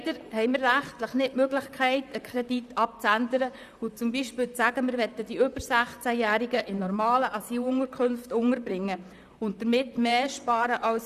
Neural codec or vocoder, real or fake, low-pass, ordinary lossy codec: vocoder, 44.1 kHz, 128 mel bands, Pupu-Vocoder; fake; 14.4 kHz; none